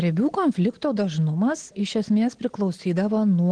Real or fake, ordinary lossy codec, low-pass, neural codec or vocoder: real; Opus, 16 kbps; 9.9 kHz; none